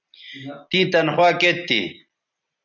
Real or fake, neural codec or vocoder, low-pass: real; none; 7.2 kHz